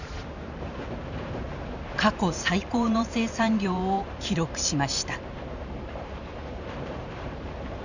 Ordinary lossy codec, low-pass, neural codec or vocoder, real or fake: none; 7.2 kHz; vocoder, 44.1 kHz, 128 mel bands every 512 samples, BigVGAN v2; fake